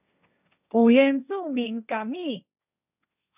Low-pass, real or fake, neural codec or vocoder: 3.6 kHz; fake; codec, 16 kHz, 1.1 kbps, Voila-Tokenizer